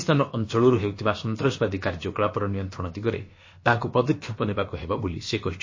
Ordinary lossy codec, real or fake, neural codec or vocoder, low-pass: MP3, 32 kbps; fake; codec, 16 kHz, about 1 kbps, DyCAST, with the encoder's durations; 7.2 kHz